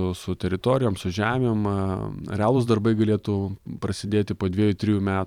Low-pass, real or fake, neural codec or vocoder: 19.8 kHz; fake; vocoder, 44.1 kHz, 128 mel bands every 512 samples, BigVGAN v2